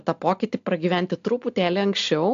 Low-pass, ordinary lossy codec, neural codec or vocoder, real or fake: 7.2 kHz; AAC, 64 kbps; none; real